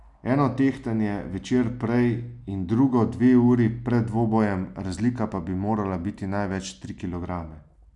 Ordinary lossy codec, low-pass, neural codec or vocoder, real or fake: none; 10.8 kHz; none; real